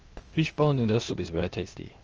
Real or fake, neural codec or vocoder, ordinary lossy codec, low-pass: fake; codec, 16 kHz, 0.8 kbps, ZipCodec; Opus, 24 kbps; 7.2 kHz